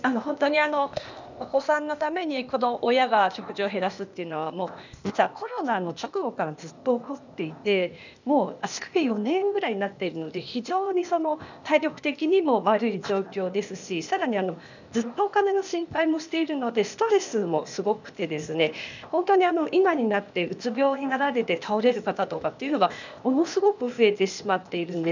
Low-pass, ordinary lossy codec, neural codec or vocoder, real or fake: 7.2 kHz; none; codec, 16 kHz, 0.8 kbps, ZipCodec; fake